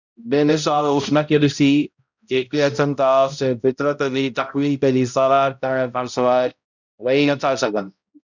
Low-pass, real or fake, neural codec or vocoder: 7.2 kHz; fake; codec, 16 kHz, 0.5 kbps, X-Codec, HuBERT features, trained on balanced general audio